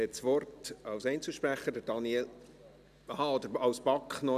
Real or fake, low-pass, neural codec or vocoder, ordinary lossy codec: real; 14.4 kHz; none; none